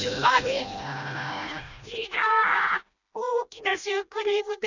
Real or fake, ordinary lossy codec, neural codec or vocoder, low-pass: fake; none; codec, 16 kHz, 1 kbps, FreqCodec, smaller model; 7.2 kHz